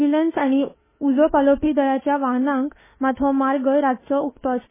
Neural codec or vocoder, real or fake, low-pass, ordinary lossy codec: autoencoder, 48 kHz, 32 numbers a frame, DAC-VAE, trained on Japanese speech; fake; 3.6 kHz; MP3, 16 kbps